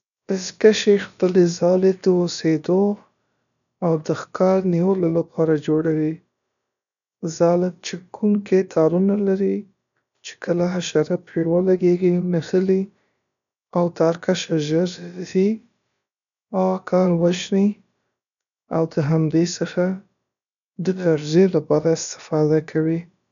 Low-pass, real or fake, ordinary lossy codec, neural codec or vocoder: 7.2 kHz; fake; none; codec, 16 kHz, about 1 kbps, DyCAST, with the encoder's durations